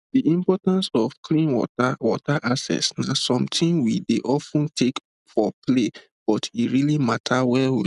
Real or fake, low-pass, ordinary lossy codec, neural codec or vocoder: real; 10.8 kHz; none; none